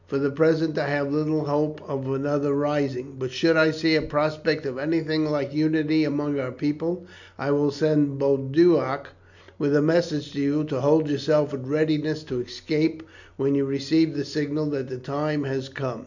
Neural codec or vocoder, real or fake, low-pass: none; real; 7.2 kHz